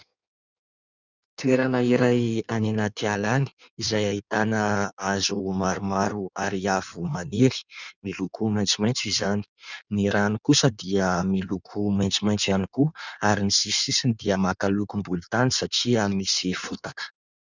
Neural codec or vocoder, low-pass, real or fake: codec, 16 kHz in and 24 kHz out, 1.1 kbps, FireRedTTS-2 codec; 7.2 kHz; fake